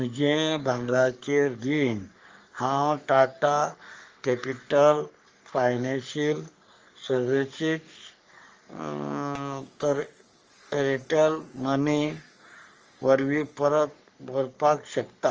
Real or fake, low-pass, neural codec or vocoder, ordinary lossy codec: fake; 7.2 kHz; codec, 44.1 kHz, 3.4 kbps, Pupu-Codec; Opus, 24 kbps